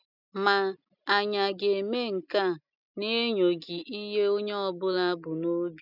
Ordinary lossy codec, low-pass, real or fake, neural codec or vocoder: none; 5.4 kHz; real; none